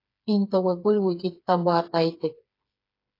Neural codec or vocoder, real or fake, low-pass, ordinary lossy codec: codec, 16 kHz, 4 kbps, FreqCodec, smaller model; fake; 5.4 kHz; AAC, 32 kbps